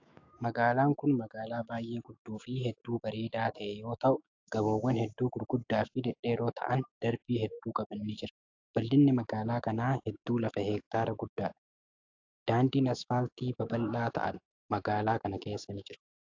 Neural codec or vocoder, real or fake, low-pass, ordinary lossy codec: autoencoder, 48 kHz, 128 numbers a frame, DAC-VAE, trained on Japanese speech; fake; 7.2 kHz; AAC, 48 kbps